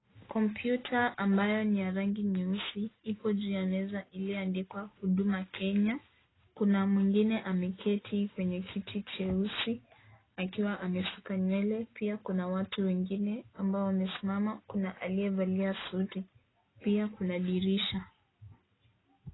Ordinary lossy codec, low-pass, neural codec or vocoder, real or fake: AAC, 16 kbps; 7.2 kHz; none; real